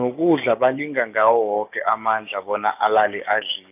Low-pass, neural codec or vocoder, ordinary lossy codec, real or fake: 3.6 kHz; codec, 16 kHz, 6 kbps, DAC; none; fake